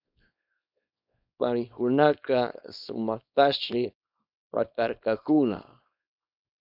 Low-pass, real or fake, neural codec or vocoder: 5.4 kHz; fake; codec, 24 kHz, 0.9 kbps, WavTokenizer, small release